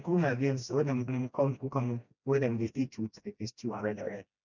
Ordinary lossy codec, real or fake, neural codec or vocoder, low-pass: none; fake; codec, 16 kHz, 1 kbps, FreqCodec, smaller model; 7.2 kHz